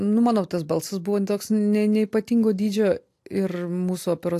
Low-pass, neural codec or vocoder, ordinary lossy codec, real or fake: 14.4 kHz; none; AAC, 64 kbps; real